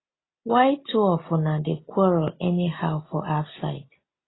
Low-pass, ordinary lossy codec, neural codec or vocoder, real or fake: 7.2 kHz; AAC, 16 kbps; none; real